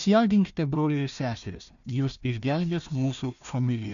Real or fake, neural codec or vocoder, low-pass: fake; codec, 16 kHz, 1 kbps, FunCodec, trained on Chinese and English, 50 frames a second; 7.2 kHz